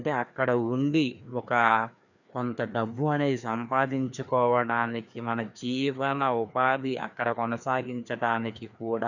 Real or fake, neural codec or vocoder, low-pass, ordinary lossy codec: fake; codec, 16 kHz, 2 kbps, FreqCodec, larger model; 7.2 kHz; none